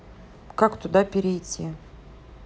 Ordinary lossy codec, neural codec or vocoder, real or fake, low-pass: none; none; real; none